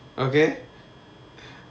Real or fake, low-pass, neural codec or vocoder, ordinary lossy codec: real; none; none; none